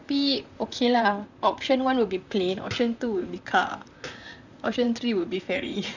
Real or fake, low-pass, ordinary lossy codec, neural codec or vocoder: fake; 7.2 kHz; none; vocoder, 44.1 kHz, 128 mel bands, Pupu-Vocoder